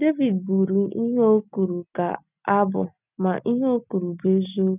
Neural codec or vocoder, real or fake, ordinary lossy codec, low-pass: none; real; none; 3.6 kHz